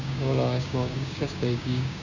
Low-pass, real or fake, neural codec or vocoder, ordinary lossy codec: 7.2 kHz; fake; codec, 16 kHz, 6 kbps, DAC; none